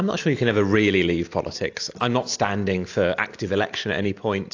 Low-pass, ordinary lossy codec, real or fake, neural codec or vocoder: 7.2 kHz; AAC, 48 kbps; real; none